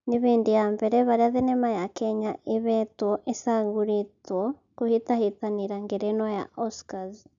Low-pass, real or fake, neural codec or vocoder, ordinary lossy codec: 7.2 kHz; real; none; none